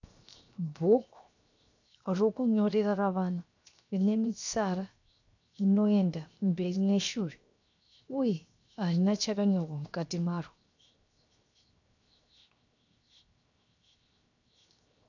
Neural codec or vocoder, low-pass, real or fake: codec, 16 kHz, 0.7 kbps, FocalCodec; 7.2 kHz; fake